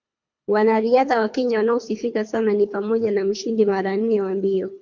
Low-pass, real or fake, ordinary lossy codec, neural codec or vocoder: 7.2 kHz; fake; MP3, 48 kbps; codec, 24 kHz, 3 kbps, HILCodec